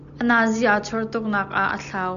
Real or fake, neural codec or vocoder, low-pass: real; none; 7.2 kHz